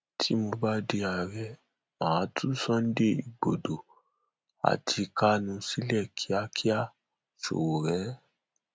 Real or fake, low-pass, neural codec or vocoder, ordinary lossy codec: real; none; none; none